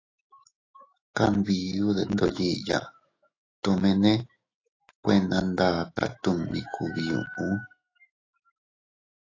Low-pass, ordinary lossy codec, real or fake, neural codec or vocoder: 7.2 kHz; AAC, 32 kbps; fake; autoencoder, 48 kHz, 128 numbers a frame, DAC-VAE, trained on Japanese speech